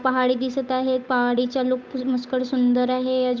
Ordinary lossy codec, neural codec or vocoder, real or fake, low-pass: none; codec, 16 kHz, 8 kbps, FunCodec, trained on Chinese and English, 25 frames a second; fake; none